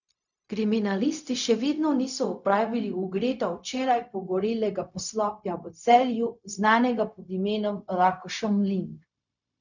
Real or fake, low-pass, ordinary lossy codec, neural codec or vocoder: fake; 7.2 kHz; none; codec, 16 kHz, 0.4 kbps, LongCat-Audio-Codec